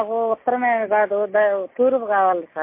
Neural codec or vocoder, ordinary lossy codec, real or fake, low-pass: none; MP3, 24 kbps; real; 3.6 kHz